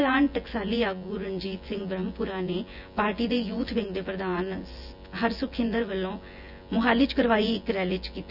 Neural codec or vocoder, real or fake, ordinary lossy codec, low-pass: vocoder, 24 kHz, 100 mel bands, Vocos; fake; none; 5.4 kHz